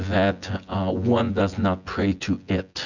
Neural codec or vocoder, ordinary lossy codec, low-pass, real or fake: vocoder, 24 kHz, 100 mel bands, Vocos; Opus, 64 kbps; 7.2 kHz; fake